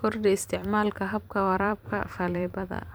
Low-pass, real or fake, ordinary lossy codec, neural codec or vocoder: none; fake; none; vocoder, 44.1 kHz, 128 mel bands every 512 samples, BigVGAN v2